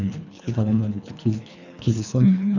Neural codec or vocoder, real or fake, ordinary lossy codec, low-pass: codec, 24 kHz, 3 kbps, HILCodec; fake; none; 7.2 kHz